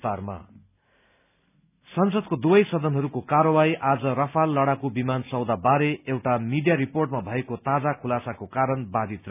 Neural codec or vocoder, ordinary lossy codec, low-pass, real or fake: none; none; 3.6 kHz; real